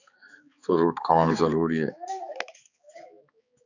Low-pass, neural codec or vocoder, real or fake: 7.2 kHz; codec, 16 kHz, 4 kbps, X-Codec, HuBERT features, trained on balanced general audio; fake